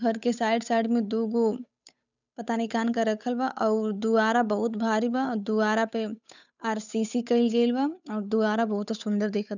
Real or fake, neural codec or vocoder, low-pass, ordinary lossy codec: fake; codec, 16 kHz, 8 kbps, FunCodec, trained on Chinese and English, 25 frames a second; 7.2 kHz; none